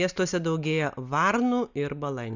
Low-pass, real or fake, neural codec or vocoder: 7.2 kHz; real; none